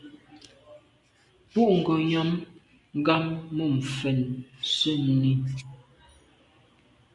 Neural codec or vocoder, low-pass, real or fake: none; 10.8 kHz; real